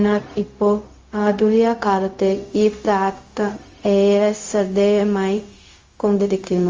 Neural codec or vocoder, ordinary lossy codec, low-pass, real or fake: codec, 16 kHz, 0.4 kbps, LongCat-Audio-Codec; Opus, 32 kbps; 7.2 kHz; fake